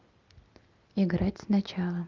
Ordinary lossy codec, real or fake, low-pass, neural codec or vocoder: Opus, 32 kbps; real; 7.2 kHz; none